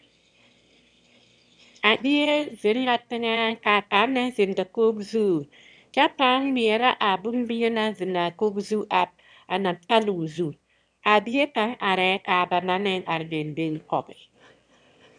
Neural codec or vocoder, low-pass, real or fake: autoencoder, 22.05 kHz, a latent of 192 numbers a frame, VITS, trained on one speaker; 9.9 kHz; fake